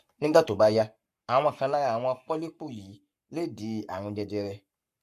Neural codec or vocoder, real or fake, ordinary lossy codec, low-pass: codec, 44.1 kHz, 7.8 kbps, Pupu-Codec; fake; MP3, 64 kbps; 14.4 kHz